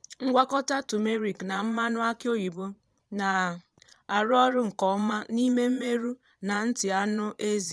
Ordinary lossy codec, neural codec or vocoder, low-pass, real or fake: none; vocoder, 22.05 kHz, 80 mel bands, Vocos; none; fake